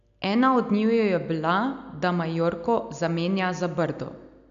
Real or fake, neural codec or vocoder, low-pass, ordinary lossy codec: real; none; 7.2 kHz; none